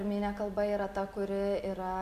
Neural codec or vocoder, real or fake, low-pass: none; real; 14.4 kHz